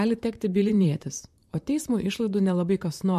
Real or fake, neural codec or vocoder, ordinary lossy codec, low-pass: fake; vocoder, 44.1 kHz, 128 mel bands, Pupu-Vocoder; MP3, 64 kbps; 14.4 kHz